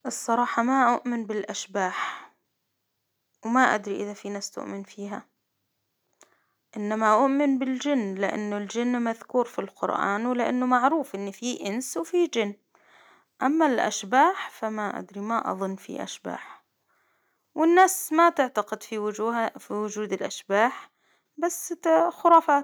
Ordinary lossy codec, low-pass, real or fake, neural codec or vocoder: none; none; real; none